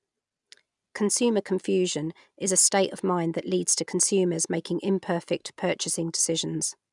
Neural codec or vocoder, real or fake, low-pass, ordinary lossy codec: none; real; 10.8 kHz; none